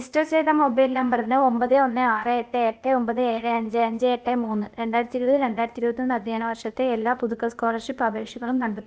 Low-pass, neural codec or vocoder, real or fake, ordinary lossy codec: none; codec, 16 kHz, 0.8 kbps, ZipCodec; fake; none